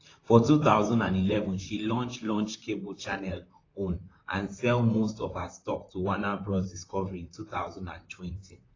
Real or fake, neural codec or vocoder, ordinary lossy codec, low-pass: fake; vocoder, 44.1 kHz, 128 mel bands, Pupu-Vocoder; AAC, 32 kbps; 7.2 kHz